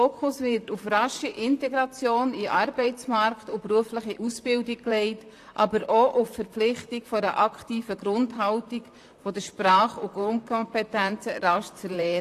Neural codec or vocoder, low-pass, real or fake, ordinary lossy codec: vocoder, 44.1 kHz, 128 mel bands, Pupu-Vocoder; 14.4 kHz; fake; AAC, 64 kbps